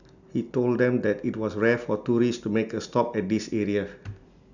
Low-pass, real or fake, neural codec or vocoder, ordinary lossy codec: 7.2 kHz; real; none; none